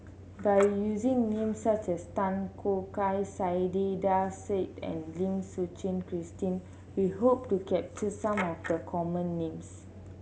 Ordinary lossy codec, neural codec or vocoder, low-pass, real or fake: none; none; none; real